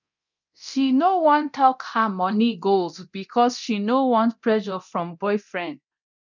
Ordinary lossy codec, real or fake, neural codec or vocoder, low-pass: none; fake; codec, 24 kHz, 0.9 kbps, DualCodec; 7.2 kHz